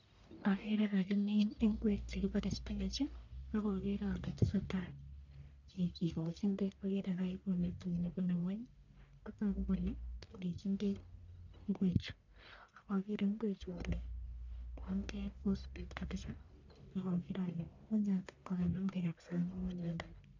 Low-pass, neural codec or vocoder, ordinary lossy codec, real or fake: 7.2 kHz; codec, 44.1 kHz, 1.7 kbps, Pupu-Codec; none; fake